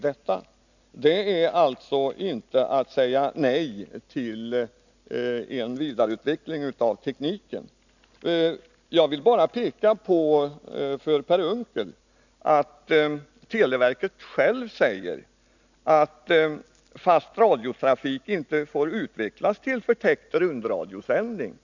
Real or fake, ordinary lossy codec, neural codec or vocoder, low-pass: real; none; none; 7.2 kHz